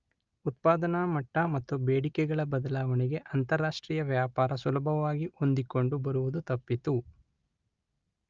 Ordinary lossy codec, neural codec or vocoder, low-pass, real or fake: Opus, 24 kbps; none; 7.2 kHz; real